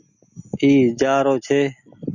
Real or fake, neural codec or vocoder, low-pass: real; none; 7.2 kHz